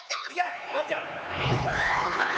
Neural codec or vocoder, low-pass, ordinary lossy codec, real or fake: codec, 16 kHz, 4 kbps, X-Codec, HuBERT features, trained on LibriSpeech; none; none; fake